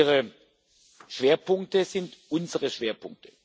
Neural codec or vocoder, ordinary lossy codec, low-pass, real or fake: none; none; none; real